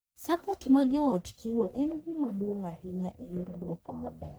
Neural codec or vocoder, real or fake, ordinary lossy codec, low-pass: codec, 44.1 kHz, 1.7 kbps, Pupu-Codec; fake; none; none